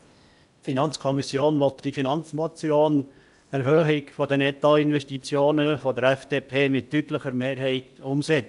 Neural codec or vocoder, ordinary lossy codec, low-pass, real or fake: codec, 16 kHz in and 24 kHz out, 0.8 kbps, FocalCodec, streaming, 65536 codes; MP3, 96 kbps; 10.8 kHz; fake